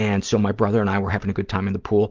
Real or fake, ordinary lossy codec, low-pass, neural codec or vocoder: real; Opus, 32 kbps; 7.2 kHz; none